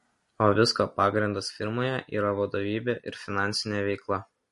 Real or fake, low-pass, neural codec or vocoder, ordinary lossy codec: real; 14.4 kHz; none; MP3, 48 kbps